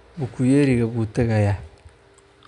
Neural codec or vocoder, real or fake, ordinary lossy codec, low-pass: none; real; none; 10.8 kHz